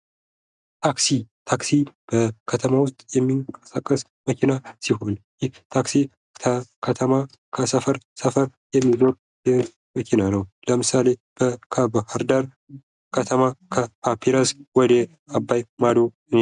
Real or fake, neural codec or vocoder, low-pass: real; none; 10.8 kHz